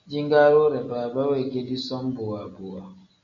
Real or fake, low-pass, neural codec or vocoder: real; 7.2 kHz; none